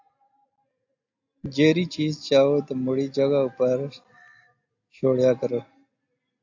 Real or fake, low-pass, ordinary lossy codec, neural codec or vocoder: real; 7.2 kHz; AAC, 48 kbps; none